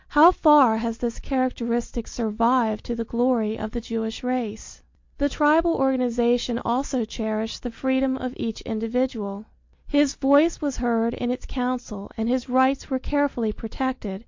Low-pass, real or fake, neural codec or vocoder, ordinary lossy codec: 7.2 kHz; real; none; MP3, 48 kbps